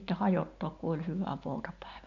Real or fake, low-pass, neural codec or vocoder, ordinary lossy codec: real; 7.2 kHz; none; none